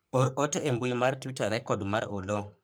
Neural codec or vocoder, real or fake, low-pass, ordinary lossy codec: codec, 44.1 kHz, 3.4 kbps, Pupu-Codec; fake; none; none